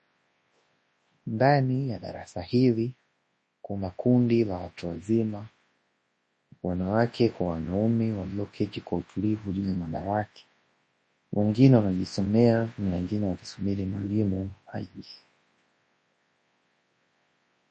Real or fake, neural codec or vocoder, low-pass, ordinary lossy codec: fake; codec, 24 kHz, 0.9 kbps, WavTokenizer, large speech release; 10.8 kHz; MP3, 32 kbps